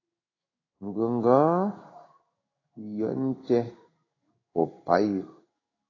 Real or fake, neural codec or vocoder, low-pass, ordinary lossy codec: fake; codec, 16 kHz in and 24 kHz out, 1 kbps, XY-Tokenizer; 7.2 kHz; AAC, 32 kbps